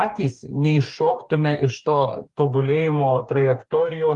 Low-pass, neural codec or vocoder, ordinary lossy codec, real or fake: 10.8 kHz; codec, 44.1 kHz, 2.6 kbps, DAC; Opus, 32 kbps; fake